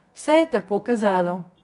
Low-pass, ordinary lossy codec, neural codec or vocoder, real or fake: 10.8 kHz; none; codec, 24 kHz, 0.9 kbps, WavTokenizer, medium music audio release; fake